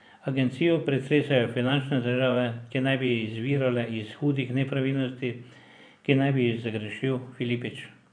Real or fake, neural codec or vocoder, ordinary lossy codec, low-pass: fake; vocoder, 24 kHz, 100 mel bands, Vocos; none; 9.9 kHz